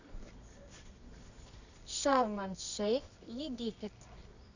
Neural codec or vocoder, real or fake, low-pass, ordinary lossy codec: codec, 24 kHz, 0.9 kbps, WavTokenizer, medium music audio release; fake; 7.2 kHz; none